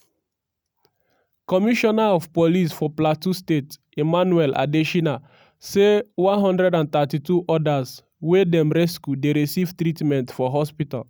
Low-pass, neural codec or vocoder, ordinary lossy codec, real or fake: none; none; none; real